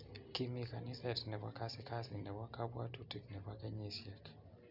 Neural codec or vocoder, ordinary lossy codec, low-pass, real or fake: none; none; 5.4 kHz; real